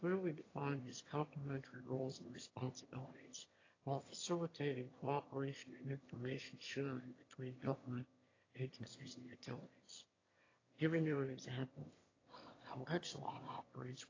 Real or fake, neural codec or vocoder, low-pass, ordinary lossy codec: fake; autoencoder, 22.05 kHz, a latent of 192 numbers a frame, VITS, trained on one speaker; 7.2 kHz; AAC, 32 kbps